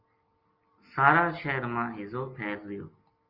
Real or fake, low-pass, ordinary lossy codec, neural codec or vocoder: real; 5.4 kHz; Opus, 24 kbps; none